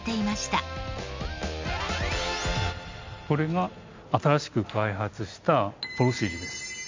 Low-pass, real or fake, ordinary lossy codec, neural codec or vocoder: 7.2 kHz; real; MP3, 64 kbps; none